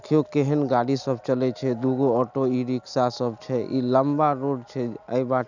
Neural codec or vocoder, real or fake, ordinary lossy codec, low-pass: none; real; none; 7.2 kHz